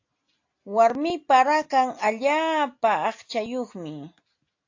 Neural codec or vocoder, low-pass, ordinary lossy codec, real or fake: none; 7.2 kHz; AAC, 48 kbps; real